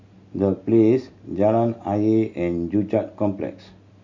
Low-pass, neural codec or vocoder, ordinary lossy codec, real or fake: 7.2 kHz; none; MP3, 48 kbps; real